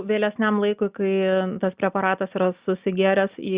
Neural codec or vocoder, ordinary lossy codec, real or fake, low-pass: none; Opus, 64 kbps; real; 3.6 kHz